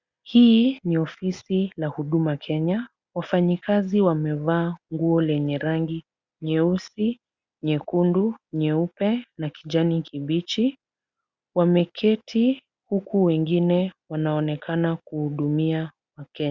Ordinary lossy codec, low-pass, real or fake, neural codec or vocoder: Opus, 64 kbps; 7.2 kHz; real; none